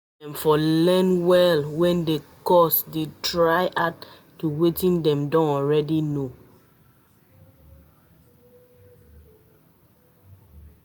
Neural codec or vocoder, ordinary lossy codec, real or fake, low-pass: none; none; real; none